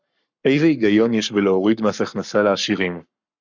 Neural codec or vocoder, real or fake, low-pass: codec, 44.1 kHz, 7.8 kbps, Pupu-Codec; fake; 7.2 kHz